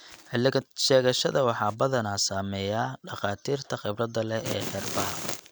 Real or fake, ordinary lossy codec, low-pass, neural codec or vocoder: real; none; none; none